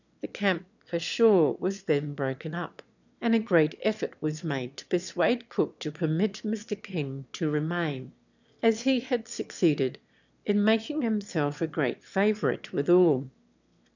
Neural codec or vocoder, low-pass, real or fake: autoencoder, 22.05 kHz, a latent of 192 numbers a frame, VITS, trained on one speaker; 7.2 kHz; fake